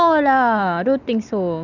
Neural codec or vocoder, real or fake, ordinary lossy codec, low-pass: none; real; none; 7.2 kHz